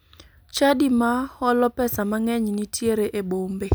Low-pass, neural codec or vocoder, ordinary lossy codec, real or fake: none; none; none; real